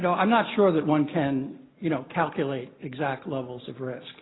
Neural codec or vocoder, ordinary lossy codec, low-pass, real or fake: none; AAC, 16 kbps; 7.2 kHz; real